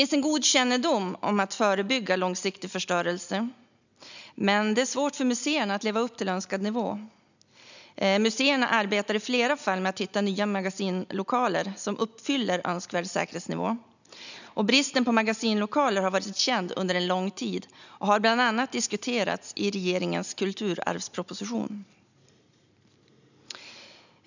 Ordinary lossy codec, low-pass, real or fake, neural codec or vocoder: none; 7.2 kHz; real; none